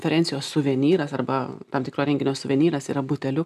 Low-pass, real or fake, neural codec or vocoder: 14.4 kHz; real; none